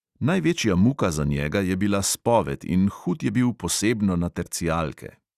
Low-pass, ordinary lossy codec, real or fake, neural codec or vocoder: 14.4 kHz; Opus, 64 kbps; real; none